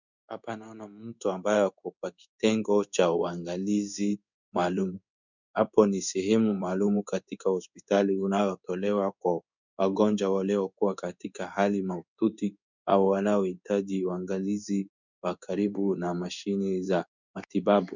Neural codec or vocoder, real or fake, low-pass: codec, 16 kHz in and 24 kHz out, 1 kbps, XY-Tokenizer; fake; 7.2 kHz